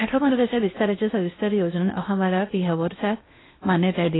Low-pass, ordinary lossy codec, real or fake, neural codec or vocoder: 7.2 kHz; AAC, 16 kbps; fake; codec, 16 kHz in and 24 kHz out, 0.6 kbps, FocalCodec, streaming, 4096 codes